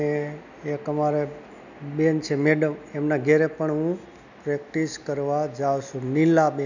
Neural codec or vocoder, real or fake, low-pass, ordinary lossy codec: none; real; 7.2 kHz; none